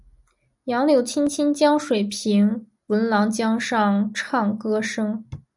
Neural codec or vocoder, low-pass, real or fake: none; 10.8 kHz; real